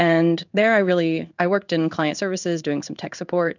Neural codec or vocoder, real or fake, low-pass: codec, 16 kHz in and 24 kHz out, 1 kbps, XY-Tokenizer; fake; 7.2 kHz